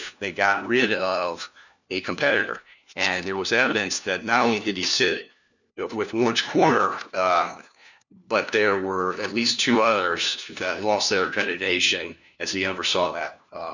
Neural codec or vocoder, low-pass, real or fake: codec, 16 kHz, 1 kbps, FunCodec, trained on LibriTTS, 50 frames a second; 7.2 kHz; fake